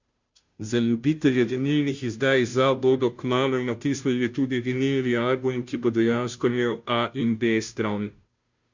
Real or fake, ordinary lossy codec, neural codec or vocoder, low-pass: fake; Opus, 64 kbps; codec, 16 kHz, 0.5 kbps, FunCodec, trained on Chinese and English, 25 frames a second; 7.2 kHz